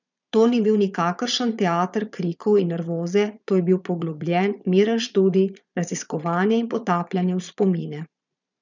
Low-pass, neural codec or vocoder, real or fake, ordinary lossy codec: 7.2 kHz; vocoder, 22.05 kHz, 80 mel bands, Vocos; fake; none